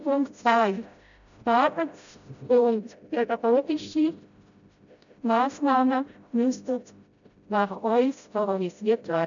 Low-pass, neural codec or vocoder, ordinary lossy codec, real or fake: 7.2 kHz; codec, 16 kHz, 0.5 kbps, FreqCodec, smaller model; none; fake